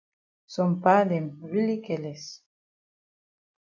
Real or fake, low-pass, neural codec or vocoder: real; 7.2 kHz; none